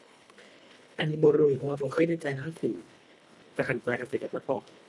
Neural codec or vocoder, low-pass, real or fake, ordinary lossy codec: codec, 24 kHz, 1.5 kbps, HILCodec; none; fake; none